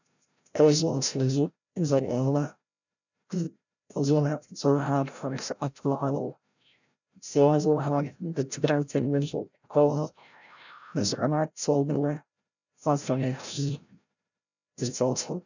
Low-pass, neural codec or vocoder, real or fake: 7.2 kHz; codec, 16 kHz, 0.5 kbps, FreqCodec, larger model; fake